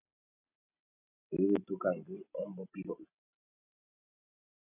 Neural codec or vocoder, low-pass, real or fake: none; 3.6 kHz; real